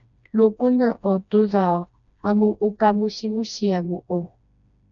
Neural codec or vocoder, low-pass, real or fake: codec, 16 kHz, 1 kbps, FreqCodec, smaller model; 7.2 kHz; fake